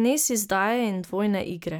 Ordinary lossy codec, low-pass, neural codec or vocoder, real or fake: none; none; none; real